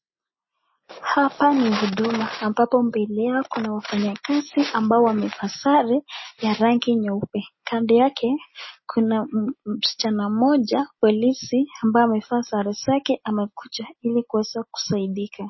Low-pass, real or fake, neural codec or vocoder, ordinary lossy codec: 7.2 kHz; real; none; MP3, 24 kbps